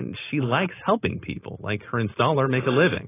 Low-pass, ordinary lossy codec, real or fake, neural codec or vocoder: 3.6 kHz; AAC, 16 kbps; real; none